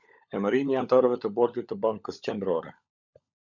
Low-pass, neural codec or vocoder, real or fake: 7.2 kHz; codec, 16 kHz, 16 kbps, FunCodec, trained on LibriTTS, 50 frames a second; fake